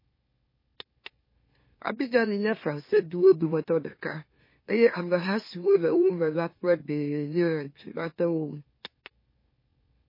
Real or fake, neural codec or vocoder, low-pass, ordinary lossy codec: fake; autoencoder, 44.1 kHz, a latent of 192 numbers a frame, MeloTTS; 5.4 kHz; MP3, 24 kbps